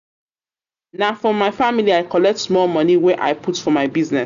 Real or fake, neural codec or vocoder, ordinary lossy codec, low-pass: real; none; none; 7.2 kHz